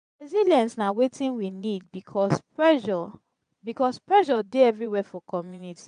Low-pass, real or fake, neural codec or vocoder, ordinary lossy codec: 9.9 kHz; fake; vocoder, 22.05 kHz, 80 mel bands, Vocos; AAC, 96 kbps